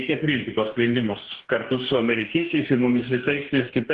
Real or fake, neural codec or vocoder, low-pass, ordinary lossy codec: fake; codec, 44.1 kHz, 2.6 kbps, DAC; 10.8 kHz; Opus, 16 kbps